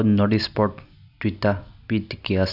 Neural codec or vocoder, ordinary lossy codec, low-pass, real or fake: none; none; 5.4 kHz; real